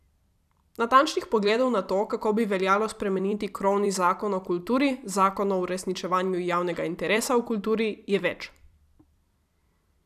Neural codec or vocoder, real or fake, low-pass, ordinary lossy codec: vocoder, 44.1 kHz, 128 mel bands every 256 samples, BigVGAN v2; fake; 14.4 kHz; none